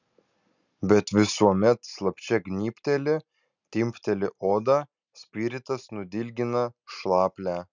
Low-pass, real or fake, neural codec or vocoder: 7.2 kHz; real; none